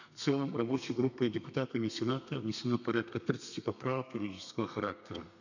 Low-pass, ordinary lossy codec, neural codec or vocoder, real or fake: 7.2 kHz; none; codec, 32 kHz, 1.9 kbps, SNAC; fake